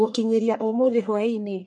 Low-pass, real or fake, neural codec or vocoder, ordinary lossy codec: 10.8 kHz; fake; codec, 44.1 kHz, 1.7 kbps, Pupu-Codec; MP3, 64 kbps